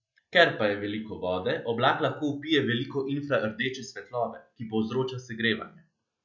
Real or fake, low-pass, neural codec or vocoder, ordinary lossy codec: real; 7.2 kHz; none; none